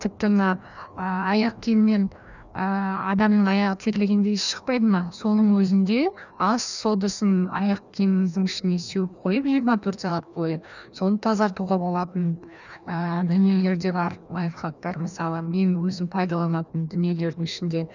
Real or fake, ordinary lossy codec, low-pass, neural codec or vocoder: fake; none; 7.2 kHz; codec, 16 kHz, 1 kbps, FreqCodec, larger model